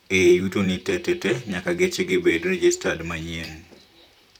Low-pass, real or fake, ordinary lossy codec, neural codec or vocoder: 19.8 kHz; fake; none; vocoder, 44.1 kHz, 128 mel bands, Pupu-Vocoder